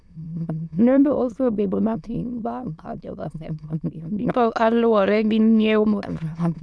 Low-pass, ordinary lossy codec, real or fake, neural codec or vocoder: none; none; fake; autoencoder, 22.05 kHz, a latent of 192 numbers a frame, VITS, trained on many speakers